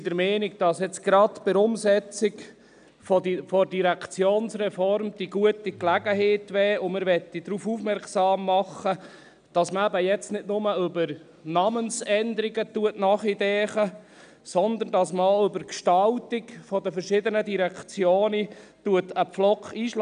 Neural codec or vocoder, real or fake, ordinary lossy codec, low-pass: none; real; none; 9.9 kHz